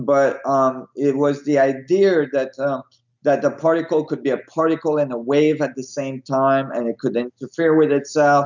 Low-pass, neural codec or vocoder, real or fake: 7.2 kHz; none; real